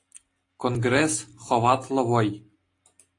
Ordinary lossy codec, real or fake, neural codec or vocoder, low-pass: AAC, 48 kbps; real; none; 10.8 kHz